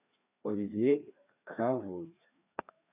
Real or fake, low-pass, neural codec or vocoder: fake; 3.6 kHz; codec, 16 kHz, 2 kbps, FreqCodec, larger model